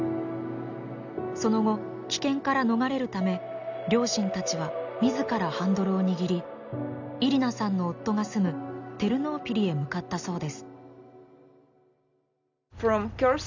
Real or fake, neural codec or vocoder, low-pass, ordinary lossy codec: real; none; 7.2 kHz; none